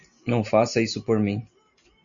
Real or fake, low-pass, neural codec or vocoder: real; 7.2 kHz; none